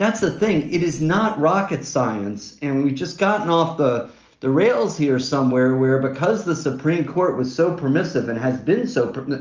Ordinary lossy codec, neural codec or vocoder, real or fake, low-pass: Opus, 24 kbps; none; real; 7.2 kHz